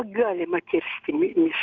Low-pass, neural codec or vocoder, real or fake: 7.2 kHz; none; real